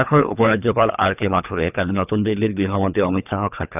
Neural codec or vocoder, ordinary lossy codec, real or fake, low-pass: codec, 24 kHz, 3 kbps, HILCodec; none; fake; 3.6 kHz